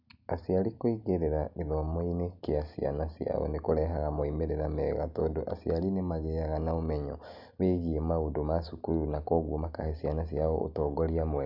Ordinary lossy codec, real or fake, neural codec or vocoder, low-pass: none; real; none; 5.4 kHz